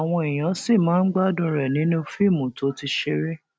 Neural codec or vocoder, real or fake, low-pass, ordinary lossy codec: none; real; none; none